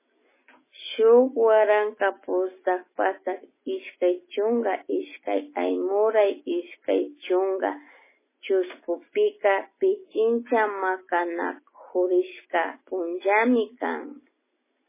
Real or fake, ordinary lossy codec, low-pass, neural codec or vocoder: real; MP3, 16 kbps; 3.6 kHz; none